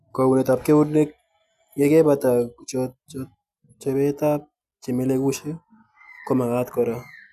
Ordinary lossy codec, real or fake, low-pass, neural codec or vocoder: none; real; 14.4 kHz; none